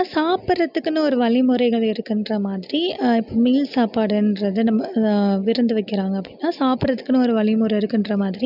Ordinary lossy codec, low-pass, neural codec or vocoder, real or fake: none; 5.4 kHz; none; real